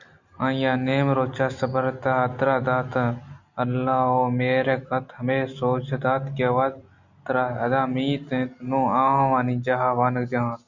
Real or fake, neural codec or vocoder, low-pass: real; none; 7.2 kHz